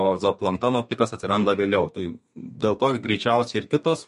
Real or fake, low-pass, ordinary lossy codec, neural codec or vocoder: fake; 14.4 kHz; MP3, 48 kbps; codec, 32 kHz, 1.9 kbps, SNAC